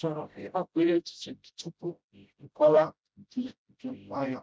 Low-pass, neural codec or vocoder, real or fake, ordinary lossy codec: none; codec, 16 kHz, 0.5 kbps, FreqCodec, smaller model; fake; none